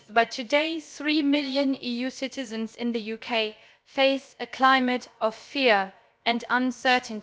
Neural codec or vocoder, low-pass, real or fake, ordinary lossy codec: codec, 16 kHz, about 1 kbps, DyCAST, with the encoder's durations; none; fake; none